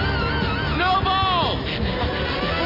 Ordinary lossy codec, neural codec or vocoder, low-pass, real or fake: none; none; 5.4 kHz; real